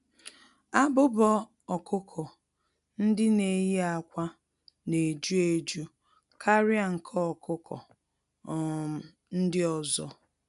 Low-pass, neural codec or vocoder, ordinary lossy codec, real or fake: 10.8 kHz; none; none; real